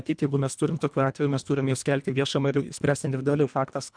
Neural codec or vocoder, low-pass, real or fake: codec, 24 kHz, 1.5 kbps, HILCodec; 9.9 kHz; fake